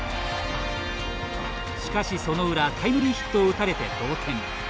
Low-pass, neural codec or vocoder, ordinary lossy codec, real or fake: none; none; none; real